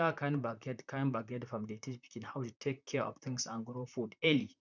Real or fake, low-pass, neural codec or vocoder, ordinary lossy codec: fake; 7.2 kHz; vocoder, 44.1 kHz, 128 mel bands, Pupu-Vocoder; none